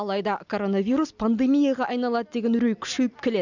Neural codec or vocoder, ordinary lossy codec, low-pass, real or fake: none; none; 7.2 kHz; real